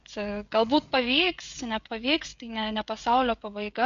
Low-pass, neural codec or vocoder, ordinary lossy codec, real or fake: 7.2 kHz; codec, 16 kHz, 16 kbps, FreqCodec, smaller model; AAC, 64 kbps; fake